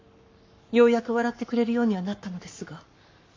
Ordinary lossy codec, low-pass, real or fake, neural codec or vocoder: MP3, 48 kbps; 7.2 kHz; fake; codec, 44.1 kHz, 7.8 kbps, Pupu-Codec